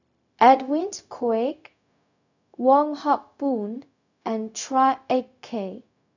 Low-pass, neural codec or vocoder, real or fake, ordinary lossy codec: 7.2 kHz; codec, 16 kHz, 0.4 kbps, LongCat-Audio-Codec; fake; none